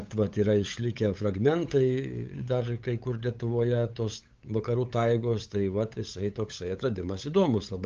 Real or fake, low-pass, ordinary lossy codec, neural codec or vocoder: fake; 7.2 kHz; Opus, 24 kbps; codec, 16 kHz, 8 kbps, FunCodec, trained on LibriTTS, 25 frames a second